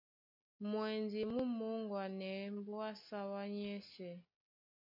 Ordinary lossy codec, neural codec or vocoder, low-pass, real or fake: MP3, 48 kbps; none; 5.4 kHz; real